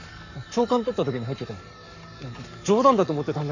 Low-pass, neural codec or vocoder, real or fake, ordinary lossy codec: 7.2 kHz; codec, 44.1 kHz, 7.8 kbps, Pupu-Codec; fake; none